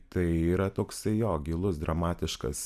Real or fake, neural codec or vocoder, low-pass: fake; vocoder, 44.1 kHz, 128 mel bands every 256 samples, BigVGAN v2; 14.4 kHz